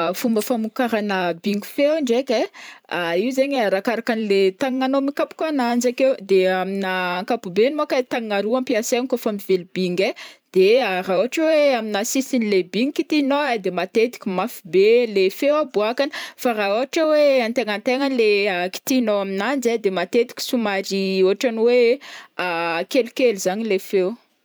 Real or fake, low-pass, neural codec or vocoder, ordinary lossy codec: fake; none; vocoder, 44.1 kHz, 128 mel bands every 512 samples, BigVGAN v2; none